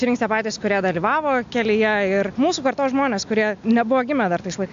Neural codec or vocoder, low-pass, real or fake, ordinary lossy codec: none; 7.2 kHz; real; AAC, 64 kbps